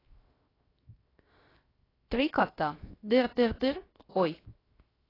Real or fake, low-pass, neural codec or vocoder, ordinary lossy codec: fake; 5.4 kHz; codec, 16 kHz, 0.7 kbps, FocalCodec; AAC, 24 kbps